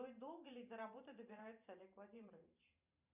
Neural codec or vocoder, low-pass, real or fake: vocoder, 44.1 kHz, 128 mel bands every 512 samples, BigVGAN v2; 3.6 kHz; fake